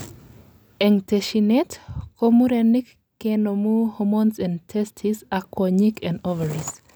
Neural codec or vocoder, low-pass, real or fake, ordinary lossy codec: none; none; real; none